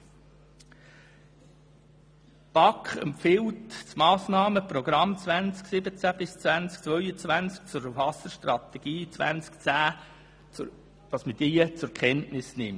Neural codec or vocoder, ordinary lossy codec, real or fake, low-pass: none; none; real; none